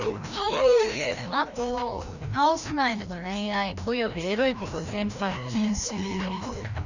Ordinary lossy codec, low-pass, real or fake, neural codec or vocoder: none; 7.2 kHz; fake; codec, 16 kHz, 1 kbps, FreqCodec, larger model